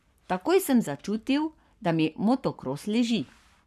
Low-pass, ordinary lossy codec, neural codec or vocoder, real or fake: 14.4 kHz; none; codec, 44.1 kHz, 7.8 kbps, Pupu-Codec; fake